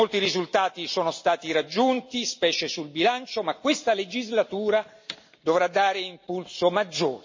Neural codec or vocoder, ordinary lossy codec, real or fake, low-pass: none; MP3, 32 kbps; real; 7.2 kHz